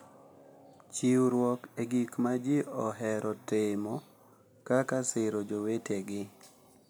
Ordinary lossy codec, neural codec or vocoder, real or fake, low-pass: none; none; real; none